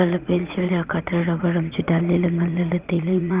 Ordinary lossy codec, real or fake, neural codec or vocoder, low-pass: Opus, 32 kbps; fake; vocoder, 44.1 kHz, 80 mel bands, Vocos; 3.6 kHz